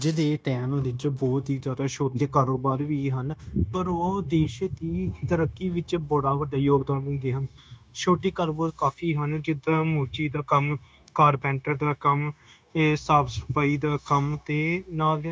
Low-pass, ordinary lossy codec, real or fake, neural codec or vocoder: none; none; fake; codec, 16 kHz, 0.9 kbps, LongCat-Audio-Codec